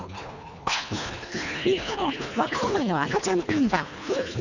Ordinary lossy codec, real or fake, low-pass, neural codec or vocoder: none; fake; 7.2 kHz; codec, 24 kHz, 1.5 kbps, HILCodec